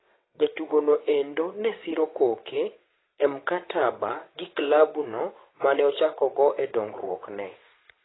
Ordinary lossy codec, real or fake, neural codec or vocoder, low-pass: AAC, 16 kbps; fake; vocoder, 22.05 kHz, 80 mel bands, WaveNeXt; 7.2 kHz